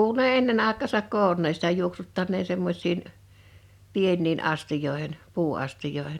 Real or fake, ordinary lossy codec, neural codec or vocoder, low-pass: real; none; none; 19.8 kHz